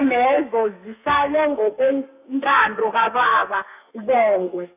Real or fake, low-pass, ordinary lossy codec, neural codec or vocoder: fake; 3.6 kHz; none; codec, 32 kHz, 1.9 kbps, SNAC